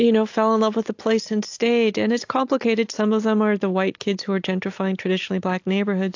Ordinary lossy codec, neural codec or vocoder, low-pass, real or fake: AAC, 48 kbps; none; 7.2 kHz; real